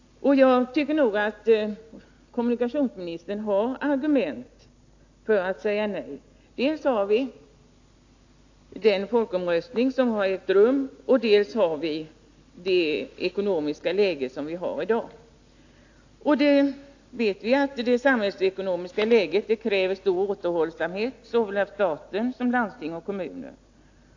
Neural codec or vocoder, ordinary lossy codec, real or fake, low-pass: none; AAC, 48 kbps; real; 7.2 kHz